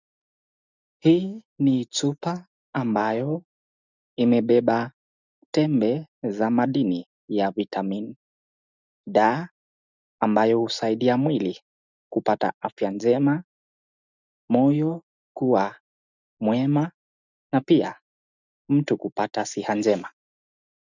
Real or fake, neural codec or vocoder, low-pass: real; none; 7.2 kHz